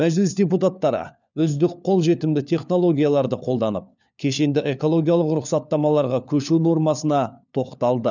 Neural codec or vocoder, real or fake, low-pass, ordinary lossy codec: codec, 16 kHz, 4 kbps, FunCodec, trained on LibriTTS, 50 frames a second; fake; 7.2 kHz; none